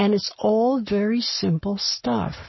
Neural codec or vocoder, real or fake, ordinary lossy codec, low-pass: codec, 44.1 kHz, 7.8 kbps, Pupu-Codec; fake; MP3, 24 kbps; 7.2 kHz